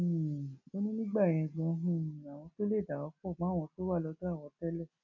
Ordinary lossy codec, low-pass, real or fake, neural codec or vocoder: none; 7.2 kHz; real; none